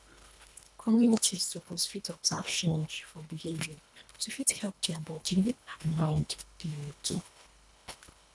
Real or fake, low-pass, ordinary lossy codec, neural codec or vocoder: fake; none; none; codec, 24 kHz, 1.5 kbps, HILCodec